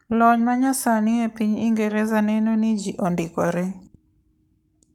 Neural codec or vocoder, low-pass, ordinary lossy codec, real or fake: codec, 44.1 kHz, 7.8 kbps, Pupu-Codec; 19.8 kHz; none; fake